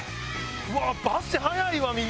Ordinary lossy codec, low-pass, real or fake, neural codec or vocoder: none; none; real; none